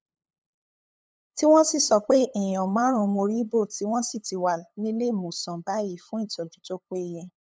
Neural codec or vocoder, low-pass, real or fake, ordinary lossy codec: codec, 16 kHz, 8 kbps, FunCodec, trained on LibriTTS, 25 frames a second; none; fake; none